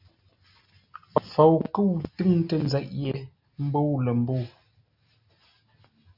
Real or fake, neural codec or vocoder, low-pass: real; none; 5.4 kHz